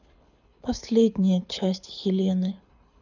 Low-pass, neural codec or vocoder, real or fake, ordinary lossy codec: 7.2 kHz; codec, 24 kHz, 6 kbps, HILCodec; fake; none